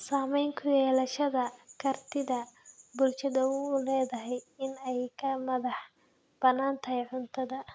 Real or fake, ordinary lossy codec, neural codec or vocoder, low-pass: real; none; none; none